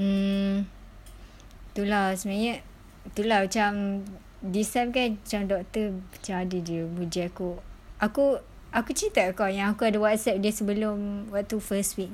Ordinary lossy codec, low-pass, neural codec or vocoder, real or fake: none; 19.8 kHz; none; real